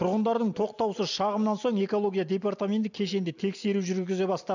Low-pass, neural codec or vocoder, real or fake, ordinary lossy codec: 7.2 kHz; none; real; none